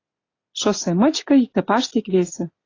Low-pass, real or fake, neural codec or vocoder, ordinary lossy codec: 7.2 kHz; real; none; AAC, 32 kbps